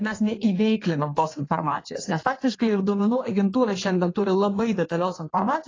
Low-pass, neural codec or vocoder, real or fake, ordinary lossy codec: 7.2 kHz; codec, 16 kHz in and 24 kHz out, 1.1 kbps, FireRedTTS-2 codec; fake; AAC, 32 kbps